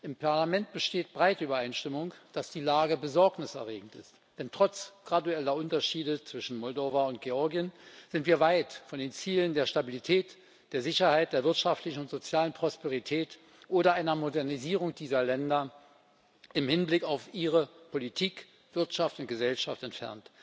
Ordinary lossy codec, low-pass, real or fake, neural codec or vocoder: none; none; real; none